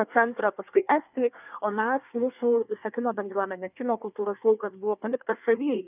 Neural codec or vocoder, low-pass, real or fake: codec, 24 kHz, 1 kbps, SNAC; 3.6 kHz; fake